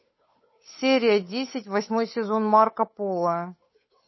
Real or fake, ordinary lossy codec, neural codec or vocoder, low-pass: fake; MP3, 24 kbps; codec, 16 kHz, 8 kbps, FunCodec, trained on Chinese and English, 25 frames a second; 7.2 kHz